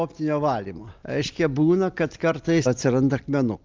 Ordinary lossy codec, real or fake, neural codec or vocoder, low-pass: Opus, 32 kbps; real; none; 7.2 kHz